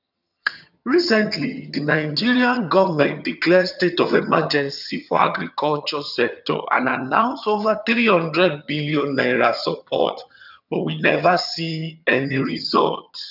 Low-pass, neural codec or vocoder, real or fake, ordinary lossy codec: 5.4 kHz; vocoder, 22.05 kHz, 80 mel bands, HiFi-GAN; fake; none